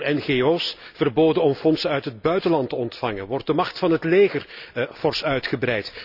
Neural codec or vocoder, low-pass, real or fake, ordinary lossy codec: none; 5.4 kHz; real; none